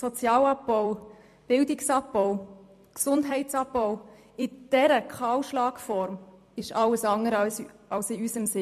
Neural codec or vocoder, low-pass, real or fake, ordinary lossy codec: vocoder, 44.1 kHz, 128 mel bands every 256 samples, BigVGAN v2; 14.4 kHz; fake; MP3, 64 kbps